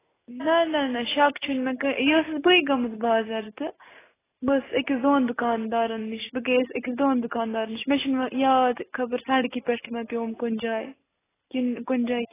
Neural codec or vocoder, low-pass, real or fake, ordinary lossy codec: none; 3.6 kHz; real; AAC, 16 kbps